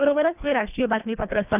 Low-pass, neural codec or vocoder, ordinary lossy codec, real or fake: 3.6 kHz; codec, 24 kHz, 1.5 kbps, HILCodec; none; fake